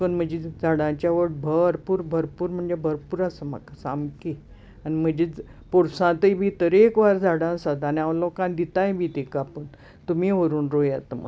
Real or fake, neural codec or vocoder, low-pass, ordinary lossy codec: real; none; none; none